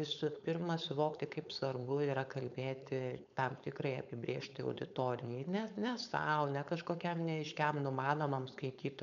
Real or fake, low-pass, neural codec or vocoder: fake; 7.2 kHz; codec, 16 kHz, 4.8 kbps, FACodec